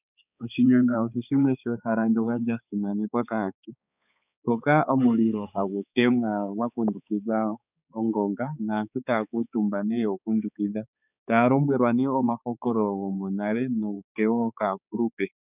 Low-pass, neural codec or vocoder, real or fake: 3.6 kHz; codec, 16 kHz, 4 kbps, X-Codec, HuBERT features, trained on balanced general audio; fake